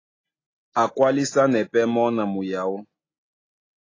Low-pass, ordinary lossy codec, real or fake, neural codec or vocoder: 7.2 kHz; AAC, 32 kbps; real; none